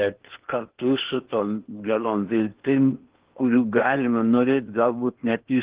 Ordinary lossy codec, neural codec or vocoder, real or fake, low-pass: Opus, 16 kbps; codec, 16 kHz in and 24 kHz out, 0.8 kbps, FocalCodec, streaming, 65536 codes; fake; 3.6 kHz